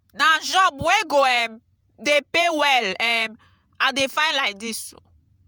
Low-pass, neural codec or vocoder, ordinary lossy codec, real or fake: none; vocoder, 48 kHz, 128 mel bands, Vocos; none; fake